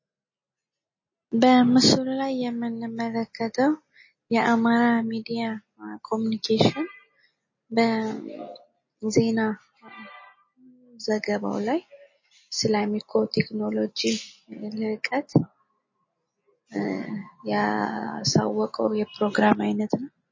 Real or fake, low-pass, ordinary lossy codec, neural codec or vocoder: real; 7.2 kHz; MP3, 32 kbps; none